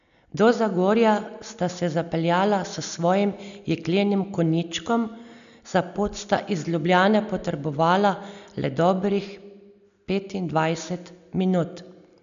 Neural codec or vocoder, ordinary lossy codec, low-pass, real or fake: none; none; 7.2 kHz; real